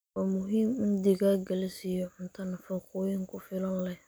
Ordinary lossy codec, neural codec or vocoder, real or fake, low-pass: none; none; real; none